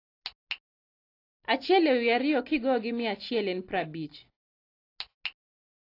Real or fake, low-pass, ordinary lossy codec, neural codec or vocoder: real; 5.4 kHz; AAC, 32 kbps; none